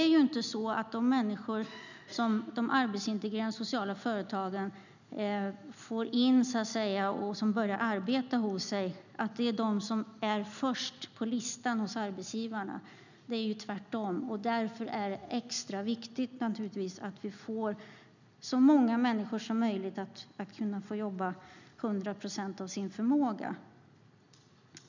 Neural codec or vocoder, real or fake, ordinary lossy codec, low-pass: none; real; none; 7.2 kHz